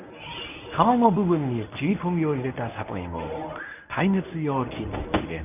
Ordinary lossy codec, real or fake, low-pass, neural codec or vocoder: none; fake; 3.6 kHz; codec, 24 kHz, 0.9 kbps, WavTokenizer, medium speech release version 1